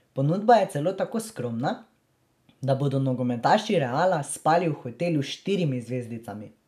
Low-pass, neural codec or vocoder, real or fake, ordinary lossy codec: 14.4 kHz; none; real; none